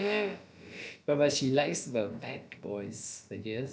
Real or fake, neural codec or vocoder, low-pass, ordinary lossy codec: fake; codec, 16 kHz, about 1 kbps, DyCAST, with the encoder's durations; none; none